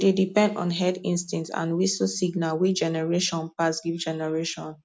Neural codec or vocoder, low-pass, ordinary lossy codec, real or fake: none; none; none; real